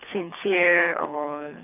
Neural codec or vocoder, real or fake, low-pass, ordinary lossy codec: codec, 24 kHz, 3 kbps, HILCodec; fake; 3.6 kHz; none